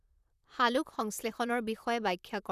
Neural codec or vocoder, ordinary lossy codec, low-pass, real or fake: vocoder, 44.1 kHz, 128 mel bands, Pupu-Vocoder; none; 14.4 kHz; fake